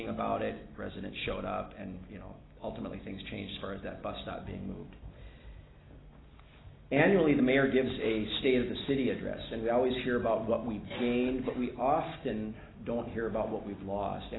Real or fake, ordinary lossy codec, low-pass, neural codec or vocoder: real; AAC, 16 kbps; 7.2 kHz; none